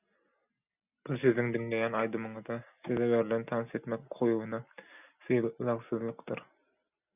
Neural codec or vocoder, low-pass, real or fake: none; 3.6 kHz; real